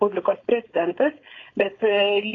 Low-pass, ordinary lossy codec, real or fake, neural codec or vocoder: 7.2 kHz; AAC, 32 kbps; fake; codec, 16 kHz, 4.8 kbps, FACodec